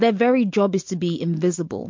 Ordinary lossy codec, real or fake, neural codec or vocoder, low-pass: MP3, 48 kbps; real; none; 7.2 kHz